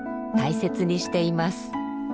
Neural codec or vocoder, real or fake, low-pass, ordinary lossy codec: none; real; none; none